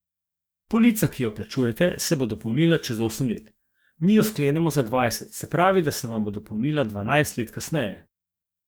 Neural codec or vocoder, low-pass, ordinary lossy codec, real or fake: codec, 44.1 kHz, 2.6 kbps, DAC; none; none; fake